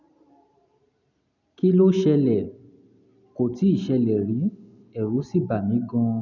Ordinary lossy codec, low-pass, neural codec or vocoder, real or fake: none; 7.2 kHz; none; real